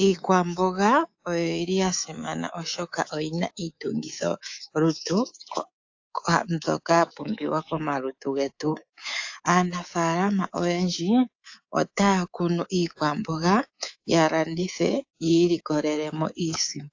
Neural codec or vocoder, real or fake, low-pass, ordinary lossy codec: codec, 24 kHz, 3.1 kbps, DualCodec; fake; 7.2 kHz; AAC, 48 kbps